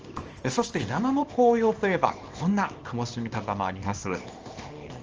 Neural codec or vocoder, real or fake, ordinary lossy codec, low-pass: codec, 24 kHz, 0.9 kbps, WavTokenizer, small release; fake; Opus, 24 kbps; 7.2 kHz